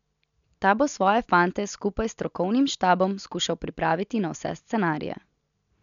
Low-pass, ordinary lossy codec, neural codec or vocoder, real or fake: 7.2 kHz; none; none; real